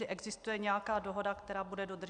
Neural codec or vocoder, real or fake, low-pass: none; real; 9.9 kHz